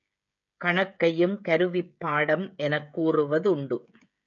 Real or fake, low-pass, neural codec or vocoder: fake; 7.2 kHz; codec, 16 kHz, 16 kbps, FreqCodec, smaller model